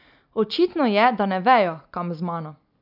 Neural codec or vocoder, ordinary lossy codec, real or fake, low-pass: none; none; real; 5.4 kHz